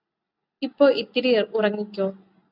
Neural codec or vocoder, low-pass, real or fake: none; 5.4 kHz; real